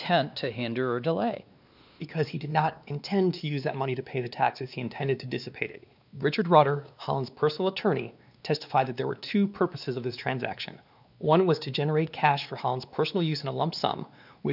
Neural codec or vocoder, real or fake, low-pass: codec, 16 kHz, 2 kbps, X-Codec, WavLM features, trained on Multilingual LibriSpeech; fake; 5.4 kHz